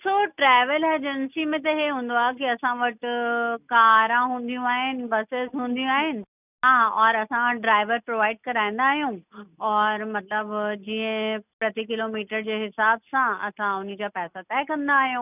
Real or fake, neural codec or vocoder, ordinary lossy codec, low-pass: real; none; none; 3.6 kHz